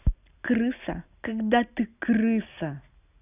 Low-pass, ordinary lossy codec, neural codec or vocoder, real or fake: 3.6 kHz; none; none; real